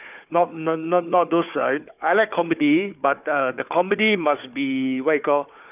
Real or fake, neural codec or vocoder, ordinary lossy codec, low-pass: fake; codec, 16 kHz, 4 kbps, FunCodec, trained on Chinese and English, 50 frames a second; none; 3.6 kHz